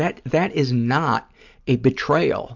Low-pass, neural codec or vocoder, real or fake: 7.2 kHz; none; real